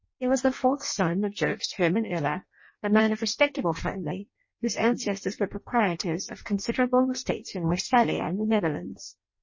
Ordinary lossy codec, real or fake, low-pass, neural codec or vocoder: MP3, 32 kbps; fake; 7.2 kHz; codec, 16 kHz in and 24 kHz out, 0.6 kbps, FireRedTTS-2 codec